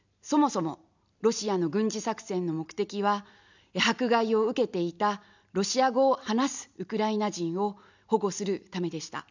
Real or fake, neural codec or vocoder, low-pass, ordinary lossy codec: real; none; 7.2 kHz; none